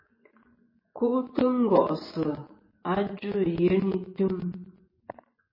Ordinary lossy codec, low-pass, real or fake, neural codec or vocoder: MP3, 24 kbps; 5.4 kHz; fake; vocoder, 24 kHz, 100 mel bands, Vocos